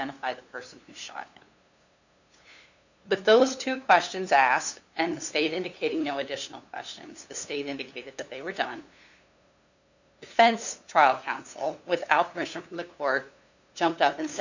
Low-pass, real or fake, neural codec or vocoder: 7.2 kHz; fake; codec, 16 kHz, 2 kbps, FunCodec, trained on LibriTTS, 25 frames a second